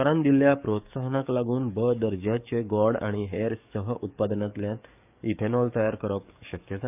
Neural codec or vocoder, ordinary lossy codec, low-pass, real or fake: codec, 44.1 kHz, 7.8 kbps, DAC; none; 3.6 kHz; fake